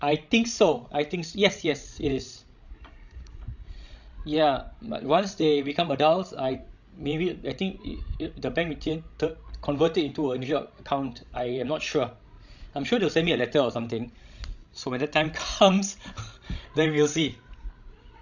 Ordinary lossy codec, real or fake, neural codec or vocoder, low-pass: none; fake; codec, 16 kHz, 16 kbps, FreqCodec, larger model; 7.2 kHz